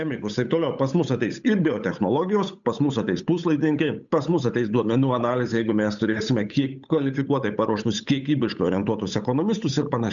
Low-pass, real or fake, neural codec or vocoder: 7.2 kHz; fake; codec, 16 kHz, 8 kbps, FunCodec, trained on Chinese and English, 25 frames a second